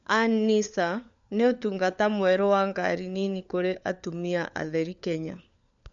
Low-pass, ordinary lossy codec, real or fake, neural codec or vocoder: 7.2 kHz; MP3, 96 kbps; fake; codec, 16 kHz, 4 kbps, FunCodec, trained on LibriTTS, 50 frames a second